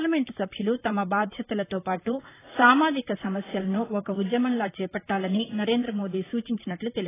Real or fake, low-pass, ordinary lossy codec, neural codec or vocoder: fake; 3.6 kHz; AAC, 16 kbps; vocoder, 44.1 kHz, 128 mel bands, Pupu-Vocoder